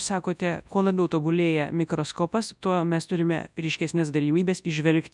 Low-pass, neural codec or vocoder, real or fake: 10.8 kHz; codec, 24 kHz, 0.9 kbps, WavTokenizer, large speech release; fake